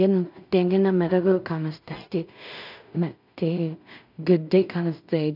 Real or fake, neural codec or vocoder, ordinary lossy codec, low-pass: fake; codec, 16 kHz in and 24 kHz out, 0.4 kbps, LongCat-Audio-Codec, two codebook decoder; none; 5.4 kHz